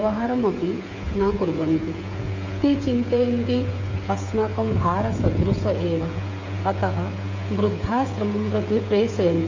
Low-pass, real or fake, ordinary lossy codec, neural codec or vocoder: 7.2 kHz; fake; MP3, 48 kbps; codec, 16 kHz, 8 kbps, FreqCodec, smaller model